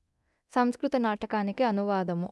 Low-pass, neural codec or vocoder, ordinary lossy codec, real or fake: none; codec, 24 kHz, 0.9 kbps, DualCodec; none; fake